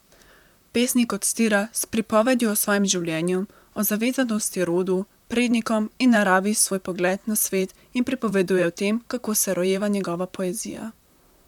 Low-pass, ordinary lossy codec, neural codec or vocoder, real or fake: 19.8 kHz; none; vocoder, 44.1 kHz, 128 mel bands, Pupu-Vocoder; fake